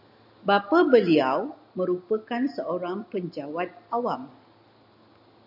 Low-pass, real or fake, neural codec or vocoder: 5.4 kHz; real; none